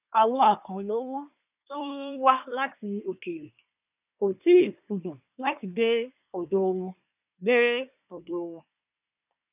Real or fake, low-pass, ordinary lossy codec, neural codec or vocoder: fake; 3.6 kHz; none; codec, 24 kHz, 1 kbps, SNAC